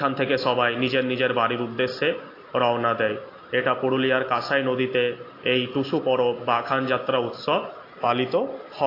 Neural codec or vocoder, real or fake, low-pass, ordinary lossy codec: none; real; 5.4 kHz; AAC, 32 kbps